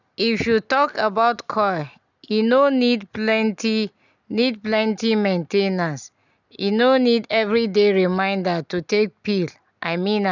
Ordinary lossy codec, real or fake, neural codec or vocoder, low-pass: none; real; none; 7.2 kHz